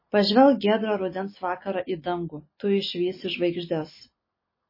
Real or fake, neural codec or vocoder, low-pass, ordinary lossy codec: real; none; 5.4 kHz; MP3, 24 kbps